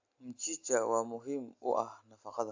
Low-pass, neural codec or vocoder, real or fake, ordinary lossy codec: 7.2 kHz; none; real; AAC, 48 kbps